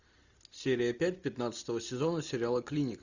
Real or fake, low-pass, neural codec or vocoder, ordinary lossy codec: real; 7.2 kHz; none; Opus, 64 kbps